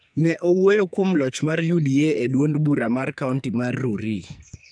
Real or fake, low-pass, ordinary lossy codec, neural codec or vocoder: fake; 9.9 kHz; none; codec, 44.1 kHz, 2.6 kbps, SNAC